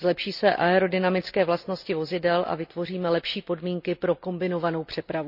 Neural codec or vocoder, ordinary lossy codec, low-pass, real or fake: none; none; 5.4 kHz; real